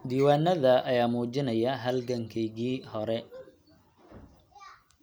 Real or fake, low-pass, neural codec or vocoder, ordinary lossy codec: real; none; none; none